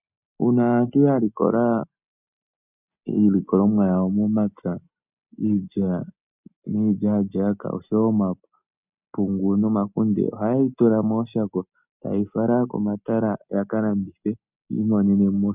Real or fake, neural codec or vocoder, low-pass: real; none; 3.6 kHz